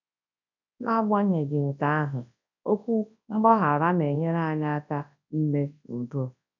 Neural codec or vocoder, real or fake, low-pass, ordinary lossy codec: codec, 24 kHz, 0.9 kbps, WavTokenizer, large speech release; fake; 7.2 kHz; none